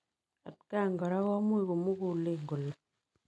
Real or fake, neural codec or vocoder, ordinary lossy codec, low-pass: real; none; none; none